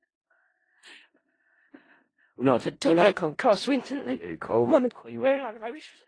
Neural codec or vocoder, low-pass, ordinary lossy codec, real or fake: codec, 16 kHz in and 24 kHz out, 0.4 kbps, LongCat-Audio-Codec, four codebook decoder; 9.9 kHz; AAC, 32 kbps; fake